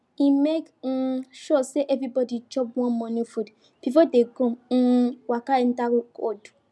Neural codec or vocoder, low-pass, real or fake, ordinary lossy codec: none; none; real; none